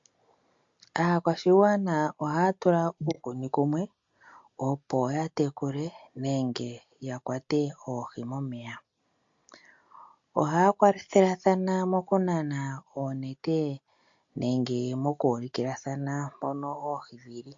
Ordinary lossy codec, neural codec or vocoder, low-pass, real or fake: MP3, 48 kbps; none; 7.2 kHz; real